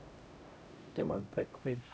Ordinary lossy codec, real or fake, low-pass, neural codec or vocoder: none; fake; none; codec, 16 kHz, 0.5 kbps, X-Codec, HuBERT features, trained on LibriSpeech